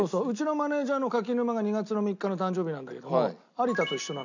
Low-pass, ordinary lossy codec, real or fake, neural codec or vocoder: 7.2 kHz; none; real; none